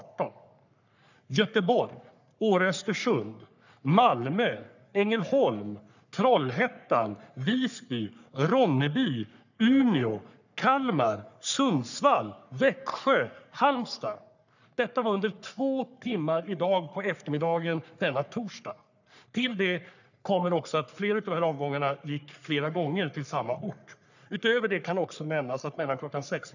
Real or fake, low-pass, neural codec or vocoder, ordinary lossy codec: fake; 7.2 kHz; codec, 44.1 kHz, 3.4 kbps, Pupu-Codec; none